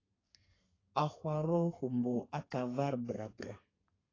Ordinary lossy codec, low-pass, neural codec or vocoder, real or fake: AAC, 32 kbps; 7.2 kHz; codec, 32 kHz, 1.9 kbps, SNAC; fake